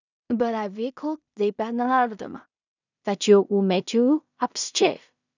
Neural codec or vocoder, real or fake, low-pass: codec, 16 kHz in and 24 kHz out, 0.4 kbps, LongCat-Audio-Codec, two codebook decoder; fake; 7.2 kHz